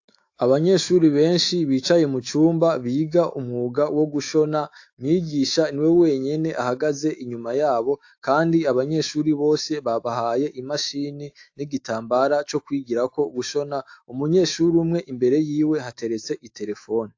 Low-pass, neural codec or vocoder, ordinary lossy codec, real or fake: 7.2 kHz; autoencoder, 48 kHz, 128 numbers a frame, DAC-VAE, trained on Japanese speech; AAC, 48 kbps; fake